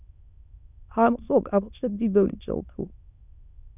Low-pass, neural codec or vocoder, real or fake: 3.6 kHz; autoencoder, 22.05 kHz, a latent of 192 numbers a frame, VITS, trained on many speakers; fake